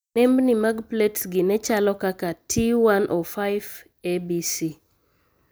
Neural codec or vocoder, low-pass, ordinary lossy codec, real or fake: none; none; none; real